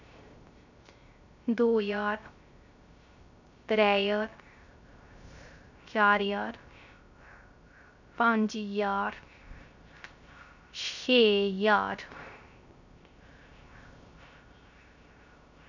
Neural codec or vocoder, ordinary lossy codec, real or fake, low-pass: codec, 16 kHz, 0.3 kbps, FocalCodec; none; fake; 7.2 kHz